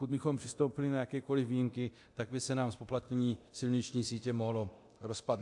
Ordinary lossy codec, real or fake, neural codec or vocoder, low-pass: AAC, 64 kbps; fake; codec, 24 kHz, 0.9 kbps, DualCodec; 10.8 kHz